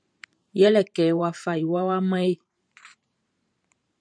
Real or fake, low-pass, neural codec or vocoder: fake; 9.9 kHz; vocoder, 44.1 kHz, 128 mel bands every 512 samples, BigVGAN v2